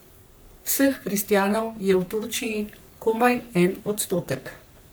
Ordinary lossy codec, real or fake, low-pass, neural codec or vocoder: none; fake; none; codec, 44.1 kHz, 3.4 kbps, Pupu-Codec